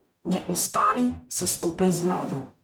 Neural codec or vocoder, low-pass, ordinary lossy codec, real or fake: codec, 44.1 kHz, 0.9 kbps, DAC; none; none; fake